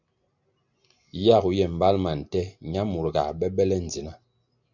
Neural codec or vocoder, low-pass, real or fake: none; 7.2 kHz; real